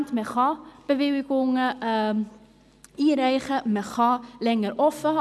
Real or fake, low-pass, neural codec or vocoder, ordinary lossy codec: real; none; none; none